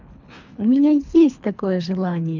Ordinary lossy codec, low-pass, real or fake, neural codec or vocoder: none; 7.2 kHz; fake; codec, 24 kHz, 3 kbps, HILCodec